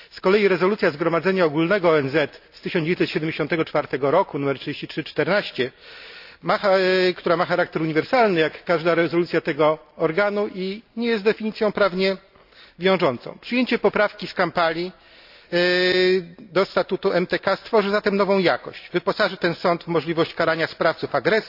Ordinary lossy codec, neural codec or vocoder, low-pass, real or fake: AAC, 48 kbps; none; 5.4 kHz; real